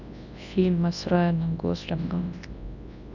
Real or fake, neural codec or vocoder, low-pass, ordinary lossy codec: fake; codec, 24 kHz, 0.9 kbps, WavTokenizer, large speech release; 7.2 kHz; none